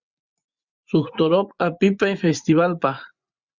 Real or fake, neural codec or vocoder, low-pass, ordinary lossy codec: fake; vocoder, 24 kHz, 100 mel bands, Vocos; 7.2 kHz; Opus, 64 kbps